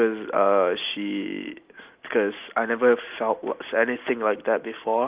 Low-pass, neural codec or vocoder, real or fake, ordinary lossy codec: 3.6 kHz; none; real; Opus, 32 kbps